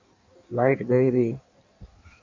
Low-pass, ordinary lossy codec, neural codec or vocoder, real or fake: 7.2 kHz; MP3, 64 kbps; codec, 16 kHz in and 24 kHz out, 1.1 kbps, FireRedTTS-2 codec; fake